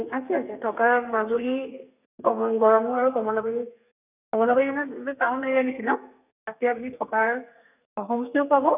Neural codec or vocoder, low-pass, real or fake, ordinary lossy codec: codec, 32 kHz, 1.9 kbps, SNAC; 3.6 kHz; fake; none